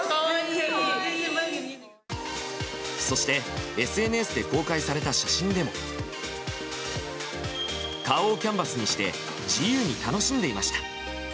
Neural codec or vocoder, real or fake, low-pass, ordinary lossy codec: none; real; none; none